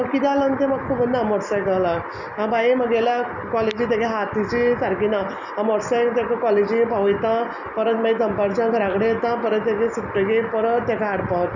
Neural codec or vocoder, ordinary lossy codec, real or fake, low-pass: none; none; real; 7.2 kHz